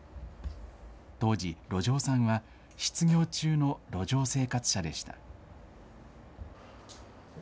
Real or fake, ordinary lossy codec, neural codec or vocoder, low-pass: real; none; none; none